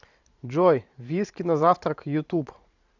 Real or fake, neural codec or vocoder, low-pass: fake; vocoder, 44.1 kHz, 128 mel bands every 512 samples, BigVGAN v2; 7.2 kHz